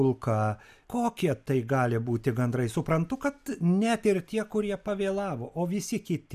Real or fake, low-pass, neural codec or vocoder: real; 14.4 kHz; none